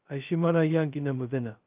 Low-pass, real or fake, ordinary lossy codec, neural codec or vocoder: 3.6 kHz; fake; Opus, 24 kbps; codec, 16 kHz, 0.2 kbps, FocalCodec